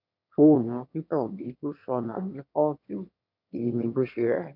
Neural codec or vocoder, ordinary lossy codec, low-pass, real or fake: autoencoder, 22.05 kHz, a latent of 192 numbers a frame, VITS, trained on one speaker; none; 5.4 kHz; fake